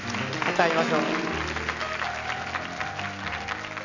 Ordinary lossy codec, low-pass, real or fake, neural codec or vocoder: none; 7.2 kHz; real; none